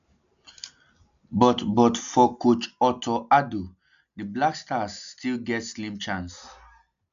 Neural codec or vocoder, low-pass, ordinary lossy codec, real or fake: none; 7.2 kHz; none; real